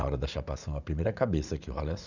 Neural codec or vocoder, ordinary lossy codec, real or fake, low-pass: vocoder, 44.1 kHz, 80 mel bands, Vocos; none; fake; 7.2 kHz